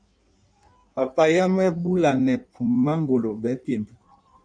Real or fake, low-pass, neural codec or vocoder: fake; 9.9 kHz; codec, 16 kHz in and 24 kHz out, 1.1 kbps, FireRedTTS-2 codec